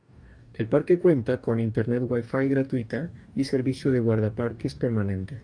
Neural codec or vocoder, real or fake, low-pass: codec, 44.1 kHz, 2.6 kbps, DAC; fake; 9.9 kHz